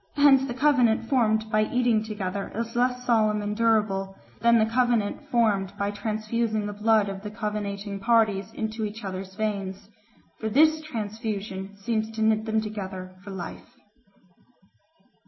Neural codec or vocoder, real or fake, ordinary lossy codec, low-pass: none; real; MP3, 24 kbps; 7.2 kHz